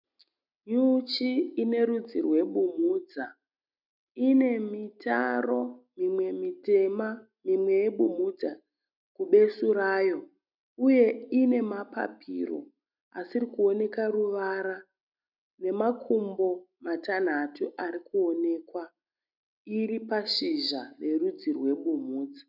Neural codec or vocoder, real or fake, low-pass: none; real; 5.4 kHz